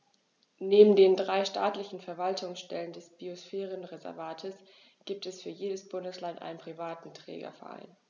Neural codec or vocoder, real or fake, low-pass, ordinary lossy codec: none; real; 7.2 kHz; none